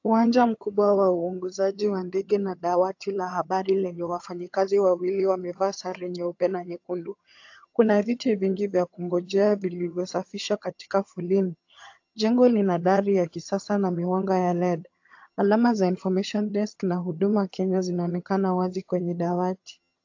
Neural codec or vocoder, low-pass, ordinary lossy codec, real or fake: vocoder, 22.05 kHz, 80 mel bands, HiFi-GAN; 7.2 kHz; AAC, 48 kbps; fake